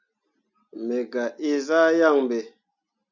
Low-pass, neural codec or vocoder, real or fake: 7.2 kHz; none; real